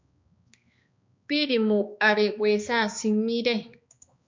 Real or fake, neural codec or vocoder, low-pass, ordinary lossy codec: fake; codec, 16 kHz, 2 kbps, X-Codec, HuBERT features, trained on balanced general audio; 7.2 kHz; MP3, 64 kbps